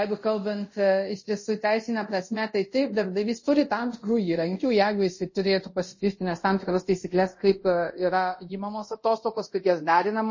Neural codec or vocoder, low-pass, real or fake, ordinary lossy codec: codec, 24 kHz, 0.5 kbps, DualCodec; 7.2 kHz; fake; MP3, 32 kbps